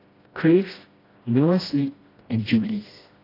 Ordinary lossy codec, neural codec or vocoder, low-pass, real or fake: AAC, 24 kbps; codec, 16 kHz, 1 kbps, FreqCodec, smaller model; 5.4 kHz; fake